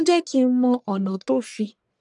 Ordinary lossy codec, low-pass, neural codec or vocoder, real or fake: none; 10.8 kHz; codec, 44.1 kHz, 1.7 kbps, Pupu-Codec; fake